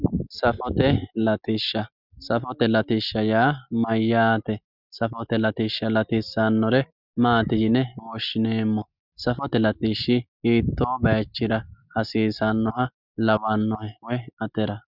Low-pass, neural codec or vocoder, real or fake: 5.4 kHz; none; real